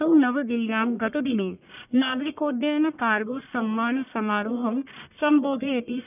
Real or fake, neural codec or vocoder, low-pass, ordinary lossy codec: fake; codec, 44.1 kHz, 1.7 kbps, Pupu-Codec; 3.6 kHz; none